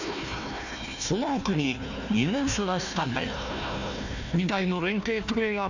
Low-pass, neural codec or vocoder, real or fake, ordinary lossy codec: 7.2 kHz; codec, 16 kHz, 1 kbps, FunCodec, trained on Chinese and English, 50 frames a second; fake; none